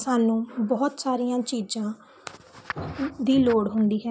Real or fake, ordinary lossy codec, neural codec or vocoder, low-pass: real; none; none; none